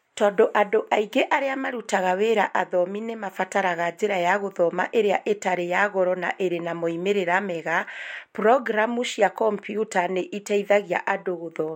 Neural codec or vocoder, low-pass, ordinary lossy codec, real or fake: none; 19.8 kHz; MP3, 64 kbps; real